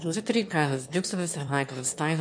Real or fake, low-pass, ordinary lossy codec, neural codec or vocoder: fake; 9.9 kHz; MP3, 64 kbps; autoencoder, 22.05 kHz, a latent of 192 numbers a frame, VITS, trained on one speaker